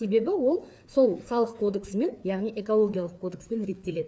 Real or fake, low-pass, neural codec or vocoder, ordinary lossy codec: fake; none; codec, 16 kHz, 4 kbps, FreqCodec, larger model; none